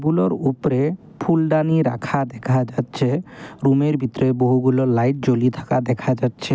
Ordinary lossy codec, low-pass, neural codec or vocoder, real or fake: none; none; none; real